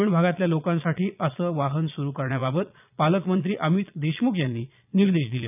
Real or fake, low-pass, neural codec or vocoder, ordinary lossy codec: fake; 3.6 kHz; vocoder, 22.05 kHz, 80 mel bands, Vocos; none